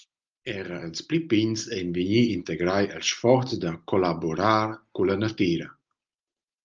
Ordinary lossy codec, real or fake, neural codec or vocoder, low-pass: Opus, 24 kbps; real; none; 7.2 kHz